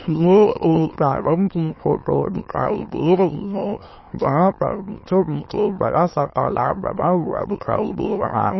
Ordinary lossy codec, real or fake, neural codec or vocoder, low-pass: MP3, 24 kbps; fake; autoencoder, 22.05 kHz, a latent of 192 numbers a frame, VITS, trained on many speakers; 7.2 kHz